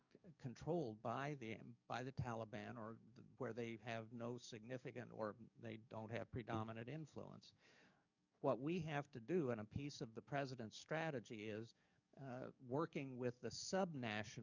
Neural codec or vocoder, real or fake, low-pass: codec, 44.1 kHz, 7.8 kbps, DAC; fake; 7.2 kHz